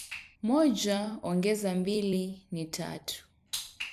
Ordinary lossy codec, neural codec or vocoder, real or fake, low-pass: none; vocoder, 44.1 kHz, 128 mel bands every 256 samples, BigVGAN v2; fake; 14.4 kHz